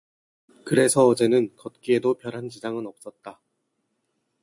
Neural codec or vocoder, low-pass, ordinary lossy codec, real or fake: none; 10.8 kHz; MP3, 64 kbps; real